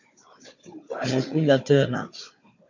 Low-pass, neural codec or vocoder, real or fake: 7.2 kHz; codec, 16 kHz, 4 kbps, FunCodec, trained on Chinese and English, 50 frames a second; fake